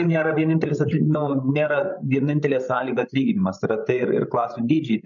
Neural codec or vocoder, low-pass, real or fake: codec, 16 kHz, 16 kbps, FreqCodec, larger model; 7.2 kHz; fake